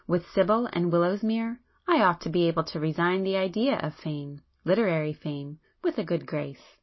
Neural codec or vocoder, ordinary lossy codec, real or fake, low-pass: none; MP3, 24 kbps; real; 7.2 kHz